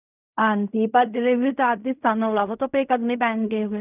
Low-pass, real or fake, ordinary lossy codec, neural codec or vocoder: 3.6 kHz; fake; none; codec, 16 kHz in and 24 kHz out, 0.4 kbps, LongCat-Audio-Codec, fine tuned four codebook decoder